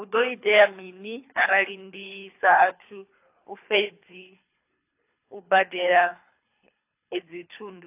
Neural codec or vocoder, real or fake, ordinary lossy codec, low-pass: codec, 24 kHz, 3 kbps, HILCodec; fake; AAC, 24 kbps; 3.6 kHz